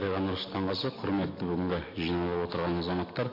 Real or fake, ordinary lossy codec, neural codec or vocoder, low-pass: real; MP3, 32 kbps; none; 5.4 kHz